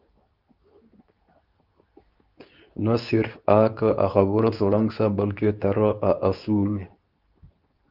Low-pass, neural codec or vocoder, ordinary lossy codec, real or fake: 5.4 kHz; codec, 24 kHz, 0.9 kbps, WavTokenizer, medium speech release version 2; Opus, 24 kbps; fake